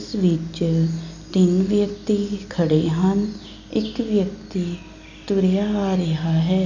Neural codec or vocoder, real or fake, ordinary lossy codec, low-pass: none; real; none; 7.2 kHz